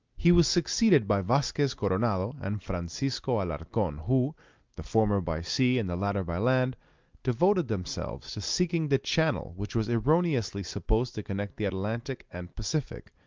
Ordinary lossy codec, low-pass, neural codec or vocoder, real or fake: Opus, 24 kbps; 7.2 kHz; none; real